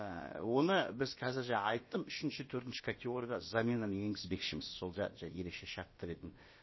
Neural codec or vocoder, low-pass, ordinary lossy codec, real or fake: codec, 16 kHz, about 1 kbps, DyCAST, with the encoder's durations; 7.2 kHz; MP3, 24 kbps; fake